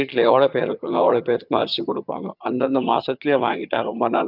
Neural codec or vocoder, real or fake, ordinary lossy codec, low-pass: vocoder, 22.05 kHz, 80 mel bands, HiFi-GAN; fake; none; 5.4 kHz